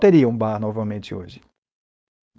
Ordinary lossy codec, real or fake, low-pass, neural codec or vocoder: none; fake; none; codec, 16 kHz, 4.8 kbps, FACodec